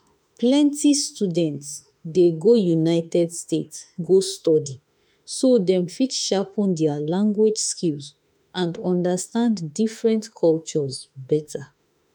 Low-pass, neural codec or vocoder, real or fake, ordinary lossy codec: none; autoencoder, 48 kHz, 32 numbers a frame, DAC-VAE, trained on Japanese speech; fake; none